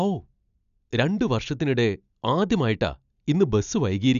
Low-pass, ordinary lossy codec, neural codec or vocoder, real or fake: 7.2 kHz; none; none; real